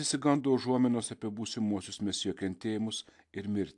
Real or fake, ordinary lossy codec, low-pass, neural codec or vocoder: real; AAC, 64 kbps; 10.8 kHz; none